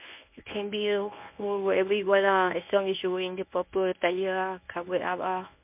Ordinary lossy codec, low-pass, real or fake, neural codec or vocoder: MP3, 32 kbps; 3.6 kHz; fake; codec, 24 kHz, 0.9 kbps, WavTokenizer, medium speech release version 1